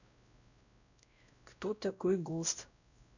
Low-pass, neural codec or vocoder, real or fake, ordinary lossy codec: 7.2 kHz; codec, 16 kHz, 0.5 kbps, X-Codec, WavLM features, trained on Multilingual LibriSpeech; fake; none